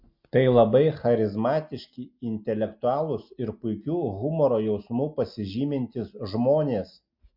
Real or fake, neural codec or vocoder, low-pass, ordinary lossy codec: real; none; 5.4 kHz; MP3, 48 kbps